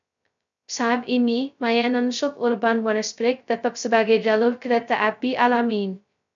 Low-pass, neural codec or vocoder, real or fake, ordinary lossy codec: 7.2 kHz; codec, 16 kHz, 0.2 kbps, FocalCodec; fake; MP3, 64 kbps